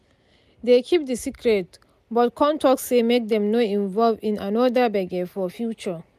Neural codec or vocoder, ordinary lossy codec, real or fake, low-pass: none; none; real; 14.4 kHz